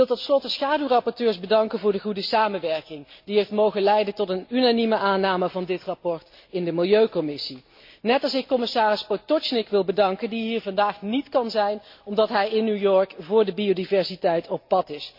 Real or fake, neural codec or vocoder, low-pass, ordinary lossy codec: real; none; 5.4 kHz; MP3, 32 kbps